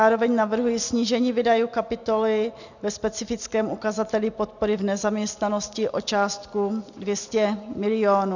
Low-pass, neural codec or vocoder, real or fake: 7.2 kHz; none; real